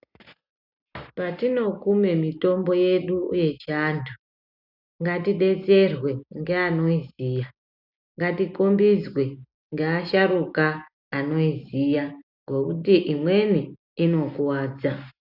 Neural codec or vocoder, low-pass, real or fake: none; 5.4 kHz; real